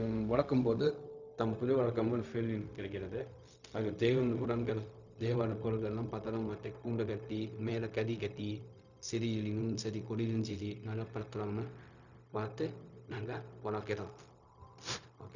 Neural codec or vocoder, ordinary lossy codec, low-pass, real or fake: codec, 16 kHz, 0.4 kbps, LongCat-Audio-Codec; none; 7.2 kHz; fake